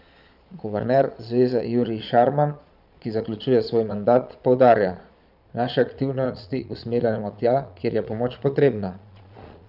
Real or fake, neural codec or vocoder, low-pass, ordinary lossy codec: fake; vocoder, 22.05 kHz, 80 mel bands, Vocos; 5.4 kHz; none